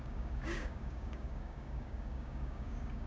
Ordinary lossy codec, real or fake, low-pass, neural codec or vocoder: none; fake; none; codec, 16 kHz, 6 kbps, DAC